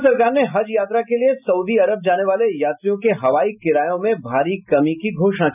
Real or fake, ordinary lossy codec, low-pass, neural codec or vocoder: real; none; 3.6 kHz; none